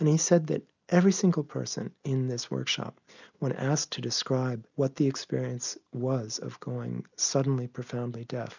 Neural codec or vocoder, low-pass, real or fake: none; 7.2 kHz; real